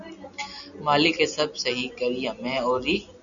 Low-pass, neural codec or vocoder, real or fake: 7.2 kHz; none; real